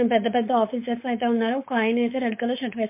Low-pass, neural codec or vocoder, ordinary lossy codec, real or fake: 3.6 kHz; codec, 16 kHz, 4.8 kbps, FACodec; MP3, 24 kbps; fake